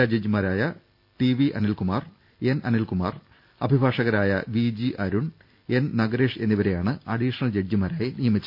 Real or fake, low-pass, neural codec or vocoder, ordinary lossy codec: real; 5.4 kHz; none; none